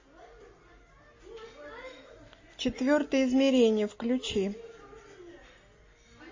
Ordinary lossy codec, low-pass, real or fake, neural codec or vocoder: MP3, 32 kbps; 7.2 kHz; real; none